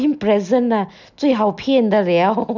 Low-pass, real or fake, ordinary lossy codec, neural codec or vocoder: 7.2 kHz; real; none; none